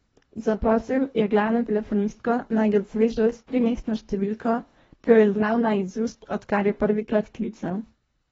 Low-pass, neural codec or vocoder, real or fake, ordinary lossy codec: 10.8 kHz; codec, 24 kHz, 1.5 kbps, HILCodec; fake; AAC, 24 kbps